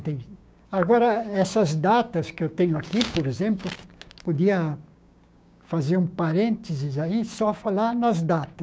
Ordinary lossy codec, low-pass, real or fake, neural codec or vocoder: none; none; fake; codec, 16 kHz, 6 kbps, DAC